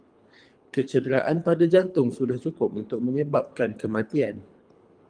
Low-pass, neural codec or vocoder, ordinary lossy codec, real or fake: 9.9 kHz; codec, 24 kHz, 3 kbps, HILCodec; Opus, 32 kbps; fake